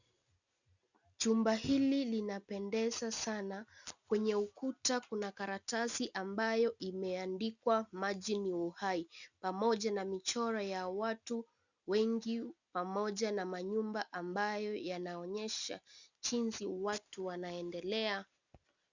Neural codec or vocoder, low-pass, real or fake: none; 7.2 kHz; real